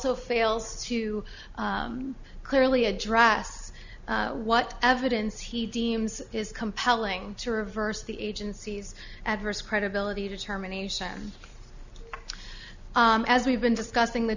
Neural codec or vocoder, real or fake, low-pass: none; real; 7.2 kHz